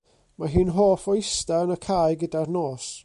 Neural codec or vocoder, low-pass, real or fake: none; 10.8 kHz; real